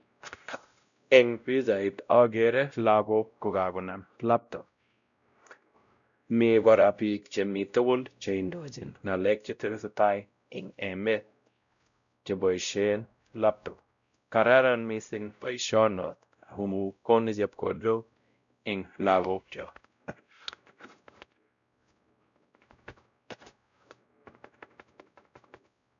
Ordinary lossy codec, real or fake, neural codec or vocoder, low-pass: none; fake; codec, 16 kHz, 0.5 kbps, X-Codec, WavLM features, trained on Multilingual LibriSpeech; 7.2 kHz